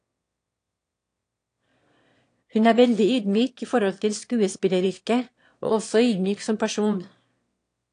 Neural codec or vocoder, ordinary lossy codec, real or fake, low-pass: autoencoder, 22.05 kHz, a latent of 192 numbers a frame, VITS, trained on one speaker; AAC, 48 kbps; fake; 9.9 kHz